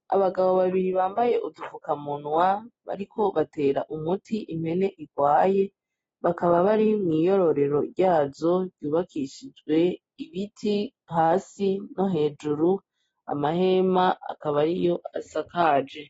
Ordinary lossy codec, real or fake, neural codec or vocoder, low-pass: AAC, 24 kbps; real; none; 9.9 kHz